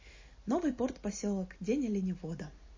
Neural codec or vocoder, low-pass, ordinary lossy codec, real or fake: none; 7.2 kHz; MP3, 32 kbps; real